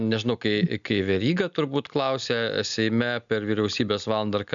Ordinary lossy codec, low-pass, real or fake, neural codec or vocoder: MP3, 96 kbps; 7.2 kHz; real; none